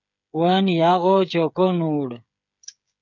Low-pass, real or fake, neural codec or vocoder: 7.2 kHz; fake; codec, 16 kHz, 8 kbps, FreqCodec, smaller model